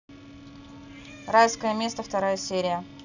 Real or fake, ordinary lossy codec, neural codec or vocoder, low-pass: real; none; none; 7.2 kHz